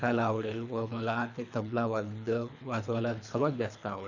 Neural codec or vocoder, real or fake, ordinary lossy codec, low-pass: codec, 24 kHz, 3 kbps, HILCodec; fake; none; 7.2 kHz